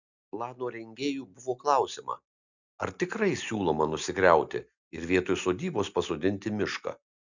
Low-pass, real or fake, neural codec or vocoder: 7.2 kHz; real; none